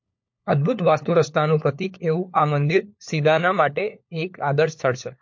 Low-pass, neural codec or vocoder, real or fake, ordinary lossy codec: 7.2 kHz; codec, 16 kHz, 4 kbps, FunCodec, trained on LibriTTS, 50 frames a second; fake; MP3, 48 kbps